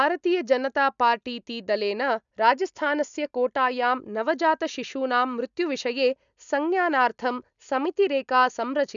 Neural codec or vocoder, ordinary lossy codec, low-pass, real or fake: none; none; 7.2 kHz; real